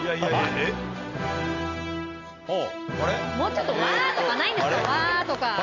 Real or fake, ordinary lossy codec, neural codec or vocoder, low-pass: real; none; none; 7.2 kHz